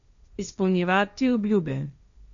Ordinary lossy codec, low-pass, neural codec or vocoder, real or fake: none; 7.2 kHz; codec, 16 kHz, 1.1 kbps, Voila-Tokenizer; fake